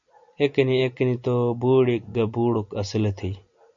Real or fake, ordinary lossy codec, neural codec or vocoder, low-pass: real; MP3, 64 kbps; none; 7.2 kHz